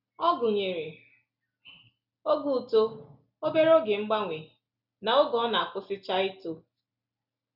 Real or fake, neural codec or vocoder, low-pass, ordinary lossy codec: real; none; 5.4 kHz; none